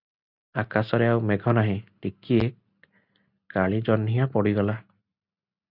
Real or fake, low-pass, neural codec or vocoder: real; 5.4 kHz; none